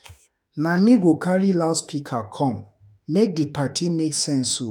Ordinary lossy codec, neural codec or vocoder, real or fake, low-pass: none; autoencoder, 48 kHz, 32 numbers a frame, DAC-VAE, trained on Japanese speech; fake; none